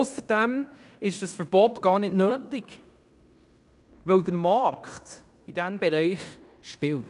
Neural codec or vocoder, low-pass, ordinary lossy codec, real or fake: codec, 16 kHz in and 24 kHz out, 0.9 kbps, LongCat-Audio-Codec, fine tuned four codebook decoder; 10.8 kHz; none; fake